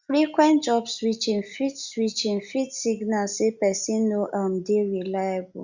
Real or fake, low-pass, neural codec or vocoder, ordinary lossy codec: real; 7.2 kHz; none; Opus, 64 kbps